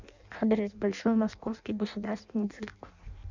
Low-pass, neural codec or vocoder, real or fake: 7.2 kHz; codec, 16 kHz in and 24 kHz out, 0.6 kbps, FireRedTTS-2 codec; fake